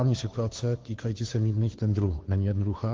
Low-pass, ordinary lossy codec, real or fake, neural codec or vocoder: 7.2 kHz; Opus, 16 kbps; fake; codec, 16 kHz, 2 kbps, FunCodec, trained on Chinese and English, 25 frames a second